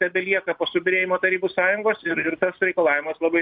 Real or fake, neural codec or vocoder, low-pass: real; none; 5.4 kHz